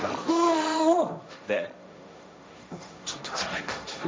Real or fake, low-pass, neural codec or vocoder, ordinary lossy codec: fake; none; codec, 16 kHz, 1.1 kbps, Voila-Tokenizer; none